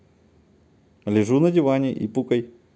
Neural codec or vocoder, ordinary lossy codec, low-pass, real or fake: none; none; none; real